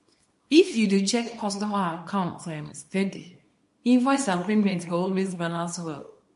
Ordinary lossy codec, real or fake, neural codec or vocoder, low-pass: MP3, 48 kbps; fake; codec, 24 kHz, 0.9 kbps, WavTokenizer, small release; 10.8 kHz